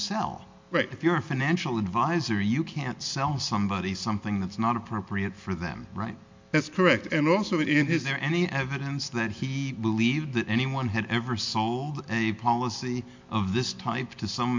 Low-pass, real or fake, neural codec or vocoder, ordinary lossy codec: 7.2 kHz; real; none; MP3, 48 kbps